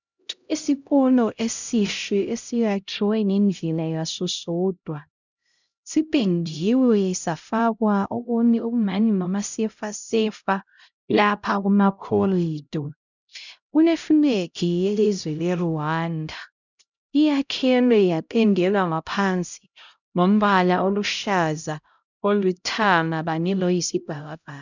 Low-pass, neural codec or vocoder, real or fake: 7.2 kHz; codec, 16 kHz, 0.5 kbps, X-Codec, HuBERT features, trained on LibriSpeech; fake